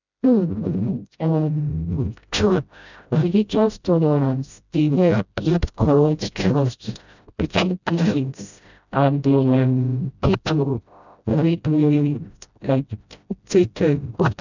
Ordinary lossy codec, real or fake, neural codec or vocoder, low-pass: none; fake; codec, 16 kHz, 0.5 kbps, FreqCodec, smaller model; 7.2 kHz